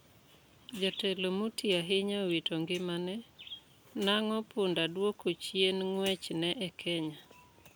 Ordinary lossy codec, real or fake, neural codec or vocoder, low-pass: none; real; none; none